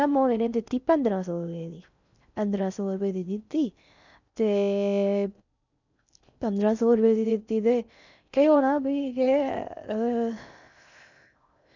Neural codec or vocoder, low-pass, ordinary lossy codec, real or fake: codec, 16 kHz in and 24 kHz out, 0.6 kbps, FocalCodec, streaming, 4096 codes; 7.2 kHz; none; fake